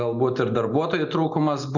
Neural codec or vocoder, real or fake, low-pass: none; real; 7.2 kHz